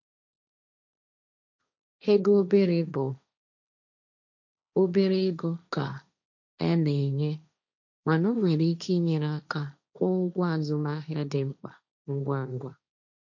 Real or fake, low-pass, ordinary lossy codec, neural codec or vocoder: fake; 7.2 kHz; none; codec, 16 kHz, 1.1 kbps, Voila-Tokenizer